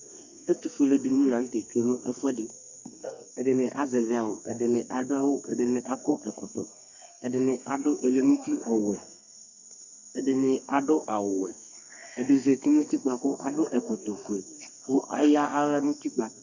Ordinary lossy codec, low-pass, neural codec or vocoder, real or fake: Opus, 64 kbps; 7.2 kHz; codec, 32 kHz, 1.9 kbps, SNAC; fake